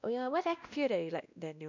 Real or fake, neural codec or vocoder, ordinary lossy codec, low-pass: fake; codec, 16 kHz, 1 kbps, X-Codec, WavLM features, trained on Multilingual LibriSpeech; none; 7.2 kHz